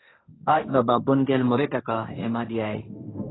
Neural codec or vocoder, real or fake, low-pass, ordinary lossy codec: codec, 16 kHz, 1.1 kbps, Voila-Tokenizer; fake; 7.2 kHz; AAC, 16 kbps